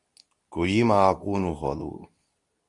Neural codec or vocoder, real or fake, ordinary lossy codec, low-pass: codec, 24 kHz, 0.9 kbps, WavTokenizer, medium speech release version 2; fake; Opus, 64 kbps; 10.8 kHz